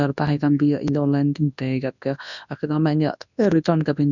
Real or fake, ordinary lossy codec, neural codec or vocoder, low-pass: fake; none; codec, 24 kHz, 0.9 kbps, WavTokenizer, large speech release; 7.2 kHz